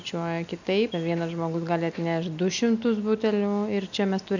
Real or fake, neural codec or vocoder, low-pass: real; none; 7.2 kHz